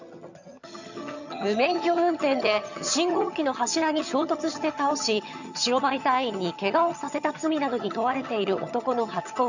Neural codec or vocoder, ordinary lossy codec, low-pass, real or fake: vocoder, 22.05 kHz, 80 mel bands, HiFi-GAN; none; 7.2 kHz; fake